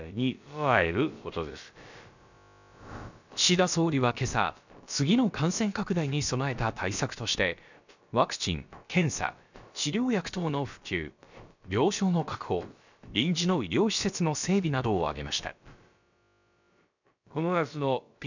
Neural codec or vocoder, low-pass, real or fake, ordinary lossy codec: codec, 16 kHz, about 1 kbps, DyCAST, with the encoder's durations; 7.2 kHz; fake; none